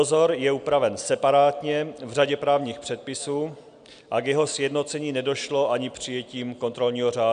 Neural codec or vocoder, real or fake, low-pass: none; real; 9.9 kHz